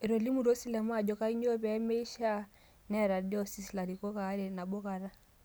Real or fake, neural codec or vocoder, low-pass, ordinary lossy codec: real; none; none; none